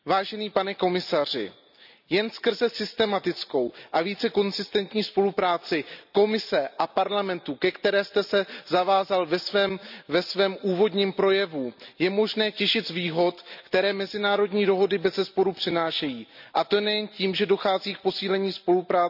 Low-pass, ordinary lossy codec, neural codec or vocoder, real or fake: 5.4 kHz; none; none; real